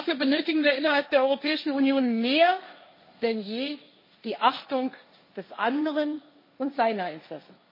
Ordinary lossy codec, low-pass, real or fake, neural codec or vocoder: MP3, 24 kbps; 5.4 kHz; fake; codec, 16 kHz, 1.1 kbps, Voila-Tokenizer